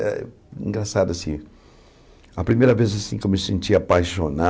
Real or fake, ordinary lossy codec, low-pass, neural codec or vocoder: real; none; none; none